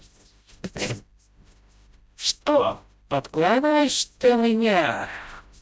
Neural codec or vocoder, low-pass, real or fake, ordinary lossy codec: codec, 16 kHz, 0.5 kbps, FreqCodec, smaller model; none; fake; none